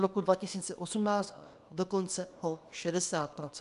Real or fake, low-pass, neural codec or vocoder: fake; 10.8 kHz; codec, 24 kHz, 0.9 kbps, WavTokenizer, small release